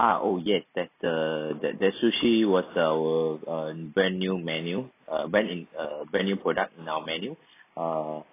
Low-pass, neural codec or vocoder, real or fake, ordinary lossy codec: 3.6 kHz; none; real; AAC, 24 kbps